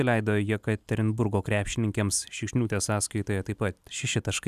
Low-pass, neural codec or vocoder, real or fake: 14.4 kHz; none; real